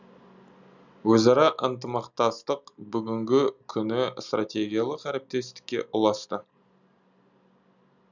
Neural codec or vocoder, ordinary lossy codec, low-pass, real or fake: none; none; 7.2 kHz; real